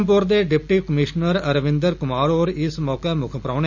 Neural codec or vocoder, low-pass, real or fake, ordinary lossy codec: none; 7.2 kHz; real; Opus, 64 kbps